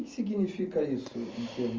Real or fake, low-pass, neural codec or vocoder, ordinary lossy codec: real; 7.2 kHz; none; Opus, 32 kbps